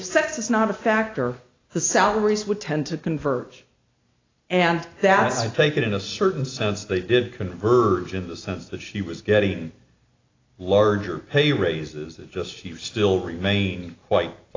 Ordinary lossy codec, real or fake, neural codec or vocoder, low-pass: AAC, 32 kbps; real; none; 7.2 kHz